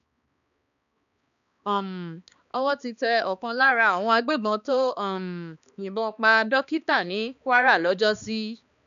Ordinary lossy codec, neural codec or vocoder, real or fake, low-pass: none; codec, 16 kHz, 2 kbps, X-Codec, HuBERT features, trained on balanced general audio; fake; 7.2 kHz